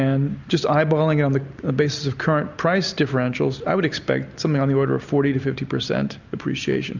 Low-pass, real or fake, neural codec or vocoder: 7.2 kHz; real; none